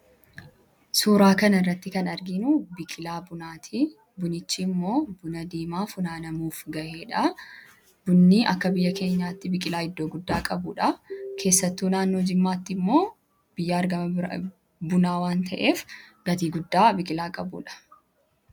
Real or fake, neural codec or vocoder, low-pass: real; none; 19.8 kHz